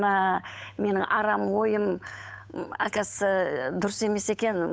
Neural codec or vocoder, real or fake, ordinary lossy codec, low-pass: codec, 16 kHz, 8 kbps, FunCodec, trained on Chinese and English, 25 frames a second; fake; none; none